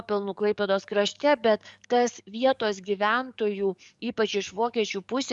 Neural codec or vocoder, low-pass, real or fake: codec, 44.1 kHz, 7.8 kbps, DAC; 10.8 kHz; fake